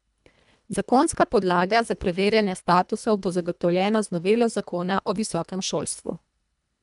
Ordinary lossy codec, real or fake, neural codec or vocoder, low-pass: none; fake; codec, 24 kHz, 1.5 kbps, HILCodec; 10.8 kHz